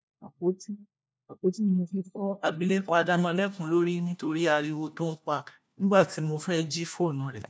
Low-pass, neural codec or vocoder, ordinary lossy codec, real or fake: none; codec, 16 kHz, 1 kbps, FunCodec, trained on LibriTTS, 50 frames a second; none; fake